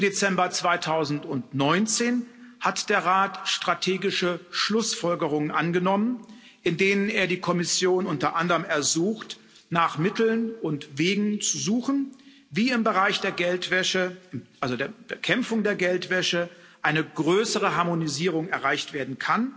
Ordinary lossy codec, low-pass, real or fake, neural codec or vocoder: none; none; real; none